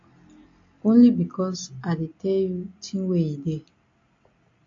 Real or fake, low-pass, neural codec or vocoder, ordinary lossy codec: real; 7.2 kHz; none; AAC, 48 kbps